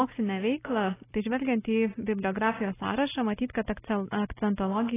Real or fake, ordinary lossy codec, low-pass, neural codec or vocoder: real; AAC, 16 kbps; 3.6 kHz; none